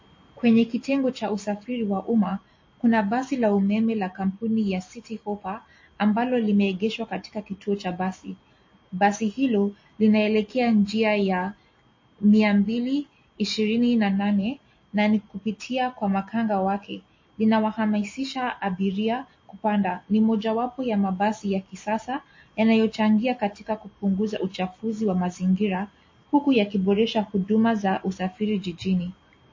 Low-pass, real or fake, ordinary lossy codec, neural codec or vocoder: 7.2 kHz; real; MP3, 32 kbps; none